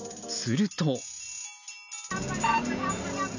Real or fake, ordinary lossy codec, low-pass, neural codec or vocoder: real; none; 7.2 kHz; none